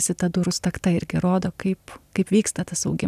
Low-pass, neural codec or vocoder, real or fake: 14.4 kHz; none; real